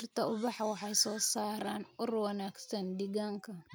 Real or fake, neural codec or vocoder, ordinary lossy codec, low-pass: real; none; none; none